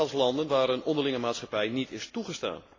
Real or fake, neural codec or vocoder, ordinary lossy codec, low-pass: real; none; AAC, 32 kbps; 7.2 kHz